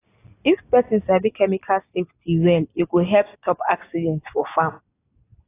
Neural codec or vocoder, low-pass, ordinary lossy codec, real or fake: none; 3.6 kHz; AAC, 24 kbps; real